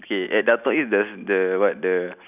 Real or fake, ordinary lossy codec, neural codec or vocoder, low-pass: real; none; none; 3.6 kHz